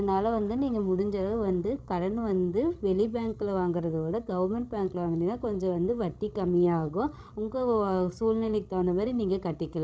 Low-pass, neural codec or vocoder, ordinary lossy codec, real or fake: none; codec, 16 kHz, 16 kbps, FreqCodec, larger model; none; fake